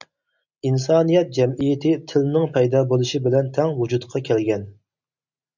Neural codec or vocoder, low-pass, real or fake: none; 7.2 kHz; real